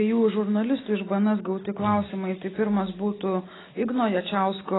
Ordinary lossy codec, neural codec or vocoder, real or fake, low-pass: AAC, 16 kbps; none; real; 7.2 kHz